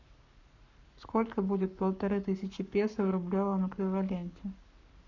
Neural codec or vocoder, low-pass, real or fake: codec, 44.1 kHz, 7.8 kbps, Pupu-Codec; 7.2 kHz; fake